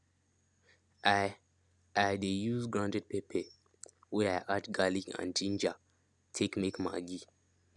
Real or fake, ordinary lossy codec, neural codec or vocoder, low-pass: real; none; none; 10.8 kHz